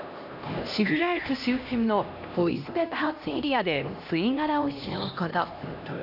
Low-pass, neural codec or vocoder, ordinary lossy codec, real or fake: 5.4 kHz; codec, 16 kHz, 1 kbps, X-Codec, HuBERT features, trained on LibriSpeech; none; fake